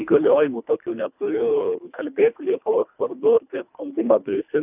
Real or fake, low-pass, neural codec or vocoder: fake; 3.6 kHz; codec, 24 kHz, 1.5 kbps, HILCodec